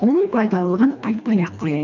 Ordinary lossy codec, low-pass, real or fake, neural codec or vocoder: none; 7.2 kHz; fake; codec, 24 kHz, 1.5 kbps, HILCodec